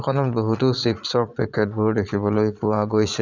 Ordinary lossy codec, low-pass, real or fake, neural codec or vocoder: none; 7.2 kHz; fake; vocoder, 22.05 kHz, 80 mel bands, Vocos